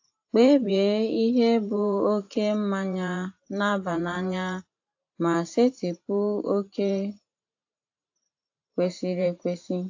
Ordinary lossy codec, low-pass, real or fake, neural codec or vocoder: AAC, 48 kbps; 7.2 kHz; fake; vocoder, 44.1 kHz, 128 mel bands every 512 samples, BigVGAN v2